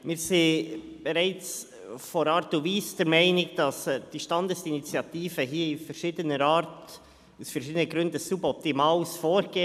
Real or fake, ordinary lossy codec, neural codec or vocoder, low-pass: real; none; none; 14.4 kHz